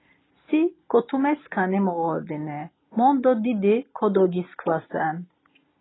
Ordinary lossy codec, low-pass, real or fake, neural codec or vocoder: AAC, 16 kbps; 7.2 kHz; real; none